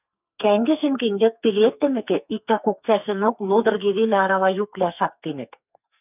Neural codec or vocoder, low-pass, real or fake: codec, 44.1 kHz, 2.6 kbps, SNAC; 3.6 kHz; fake